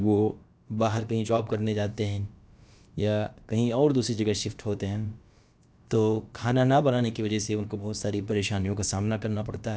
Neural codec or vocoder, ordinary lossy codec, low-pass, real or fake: codec, 16 kHz, about 1 kbps, DyCAST, with the encoder's durations; none; none; fake